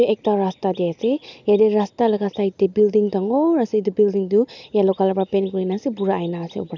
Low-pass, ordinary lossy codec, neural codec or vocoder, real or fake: 7.2 kHz; none; none; real